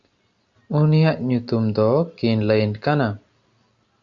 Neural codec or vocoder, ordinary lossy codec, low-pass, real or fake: none; Opus, 64 kbps; 7.2 kHz; real